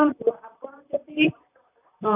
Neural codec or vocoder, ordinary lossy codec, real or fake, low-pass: vocoder, 44.1 kHz, 128 mel bands, Pupu-Vocoder; none; fake; 3.6 kHz